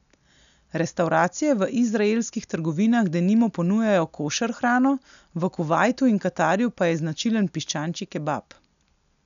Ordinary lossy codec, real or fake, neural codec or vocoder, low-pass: none; real; none; 7.2 kHz